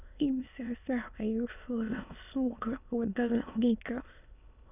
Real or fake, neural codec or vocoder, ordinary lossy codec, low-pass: fake; autoencoder, 22.05 kHz, a latent of 192 numbers a frame, VITS, trained on many speakers; none; 3.6 kHz